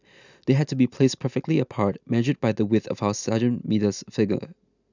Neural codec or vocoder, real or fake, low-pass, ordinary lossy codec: none; real; 7.2 kHz; none